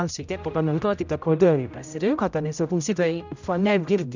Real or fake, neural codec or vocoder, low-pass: fake; codec, 16 kHz, 0.5 kbps, X-Codec, HuBERT features, trained on general audio; 7.2 kHz